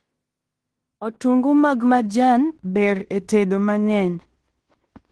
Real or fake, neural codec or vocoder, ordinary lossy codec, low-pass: fake; codec, 16 kHz in and 24 kHz out, 0.9 kbps, LongCat-Audio-Codec, fine tuned four codebook decoder; Opus, 16 kbps; 10.8 kHz